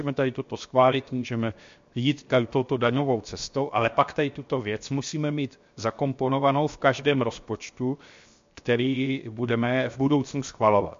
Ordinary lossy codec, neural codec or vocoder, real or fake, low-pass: MP3, 48 kbps; codec, 16 kHz, 0.7 kbps, FocalCodec; fake; 7.2 kHz